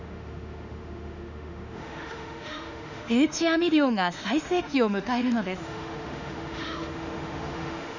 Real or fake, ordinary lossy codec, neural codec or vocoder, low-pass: fake; none; autoencoder, 48 kHz, 32 numbers a frame, DAC-VAE, trained on Japanese speech; 7.2 kHz